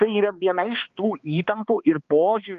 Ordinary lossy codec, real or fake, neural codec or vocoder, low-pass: Opus, 32 kbps; fake; codec, 16 kHz, 2 kbps, X-Codec, HuBERT features, trained on balanced general audio; 7.2 kHz